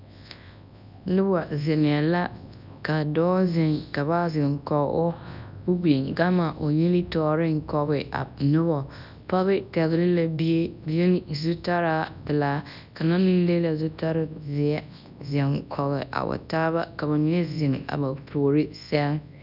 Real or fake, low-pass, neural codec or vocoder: fake; 5.4 kHz; codec, 24 kHz, 0.9 kbps, WavTokenizer, large speech release